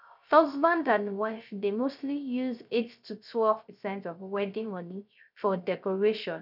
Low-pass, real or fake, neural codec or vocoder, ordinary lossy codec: 5.4 kHz; fake; codec, 16 kHz, 0.3 kbps, FocalCodec; none